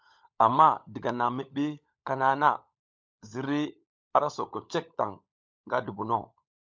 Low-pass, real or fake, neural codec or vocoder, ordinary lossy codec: 7.2 kHz; fake; codec, 16 kHz, 16 kbps, FunCodec, trained on LibriTTS, 50 frames a second; MP3, 64 kbps